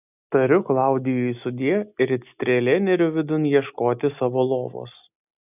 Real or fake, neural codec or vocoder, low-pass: real; none; 3.6 kHz